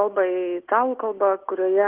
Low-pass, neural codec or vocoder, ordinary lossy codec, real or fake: 3.6 kHz; none; Opus, 16 kbps; real